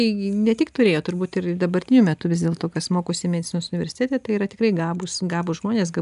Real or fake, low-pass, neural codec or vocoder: real; 10.8 kHz; none